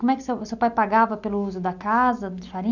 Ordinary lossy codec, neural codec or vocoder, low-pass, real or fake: none; none; 7.2 kHz; real